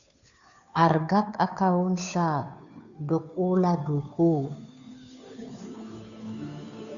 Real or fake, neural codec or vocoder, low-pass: fake; codec, 16 kHz, 2 kbps, FunCodec, trained on Chinese and English, 25 frames a second; 7.2 kHz